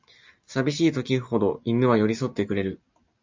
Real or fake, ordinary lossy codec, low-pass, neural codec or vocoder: real; MP3, 64 kbps; 7.2 kHz; none